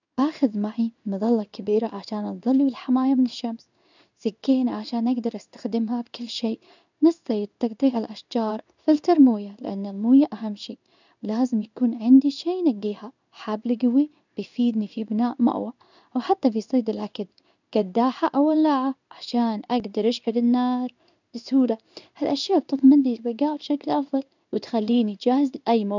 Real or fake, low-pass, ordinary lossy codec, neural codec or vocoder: fake; 7.2 kHz; none; codec, 16 kHz in and 24 kHz out, 1 kbps, XY-Tokenizer